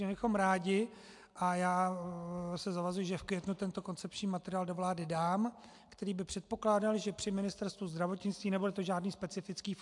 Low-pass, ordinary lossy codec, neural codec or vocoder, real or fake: 10.8 kHz; AAC, 64 kbps; none; real